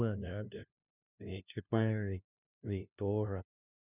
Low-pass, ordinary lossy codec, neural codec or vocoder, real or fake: 3.6 kHz; none; codec, 16 kHz, 0.5 kbps, FunCodec, trained on LibriTTS, 25 frames a second; fake